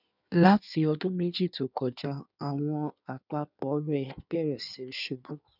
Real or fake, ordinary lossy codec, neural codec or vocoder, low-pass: fake; none; codec, 16 kHz in and 24 kHz out, 1.1 kbps, FireRedTTS-2 codec; 5.4 kHz